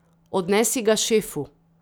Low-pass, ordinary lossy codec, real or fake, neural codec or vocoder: none; none; real; none